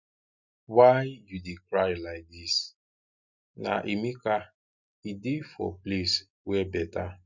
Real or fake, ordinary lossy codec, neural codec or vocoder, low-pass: real; none; none; 7.2 kHz